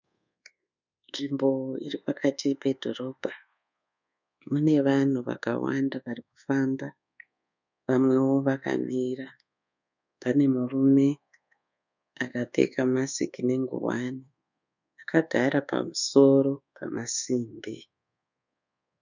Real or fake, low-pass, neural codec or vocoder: fake; 7.2 kHz; codec, 24 kHz, 1.2 kbps, DualCodec